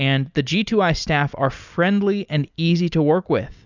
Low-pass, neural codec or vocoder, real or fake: 7.2 kHz; none; real